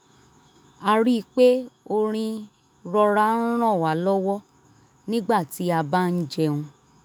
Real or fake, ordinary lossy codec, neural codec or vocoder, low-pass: fake; none; autoencoder, 48 kHz, 128 numbers a frame, DAC-VAE, trained on Japanese speech; none